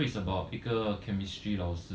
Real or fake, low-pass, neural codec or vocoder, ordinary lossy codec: real; none; none; none